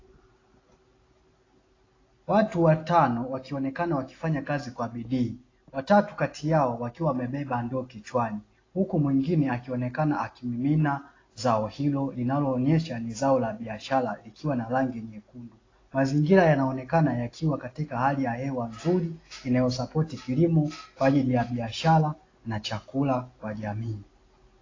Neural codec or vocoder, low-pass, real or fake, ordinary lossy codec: none; 7.2 kHz; real; AAC, 32 kbps